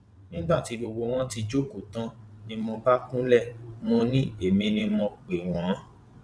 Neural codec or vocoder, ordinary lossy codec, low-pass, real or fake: vocoder, 22.05 kHz, 80 mel bands, WaveNeXt; none; none; fake